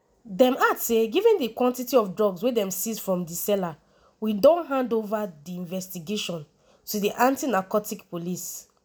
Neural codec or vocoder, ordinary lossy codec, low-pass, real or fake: none; none; none; real